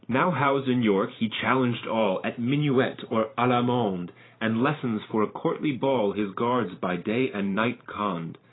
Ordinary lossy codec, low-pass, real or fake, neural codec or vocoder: AAC, 16 kbps; 7.2 kHz; fake; vocoder, 44.1 kHz, 128 mel bands every 512 samples, BigVGAN v2